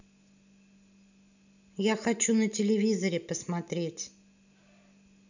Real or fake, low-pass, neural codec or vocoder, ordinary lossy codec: real; 7.2 kHz; none; none